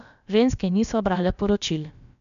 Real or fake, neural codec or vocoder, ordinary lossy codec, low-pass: fake; codec, 16 kHz, about 1 kbps, DyCAST, with the encoder's durations; none; 7.2 kHz